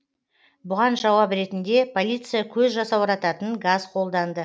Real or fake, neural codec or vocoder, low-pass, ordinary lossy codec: real; none; none; none